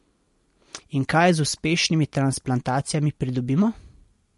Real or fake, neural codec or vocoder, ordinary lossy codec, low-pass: real; none; MP3, 48 kbps; 14.4 kHz